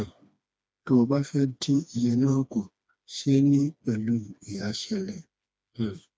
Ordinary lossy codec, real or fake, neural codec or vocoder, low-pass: none; fake; codec, 16 kHz, 2 kbps, FreqCodec, smaller model; none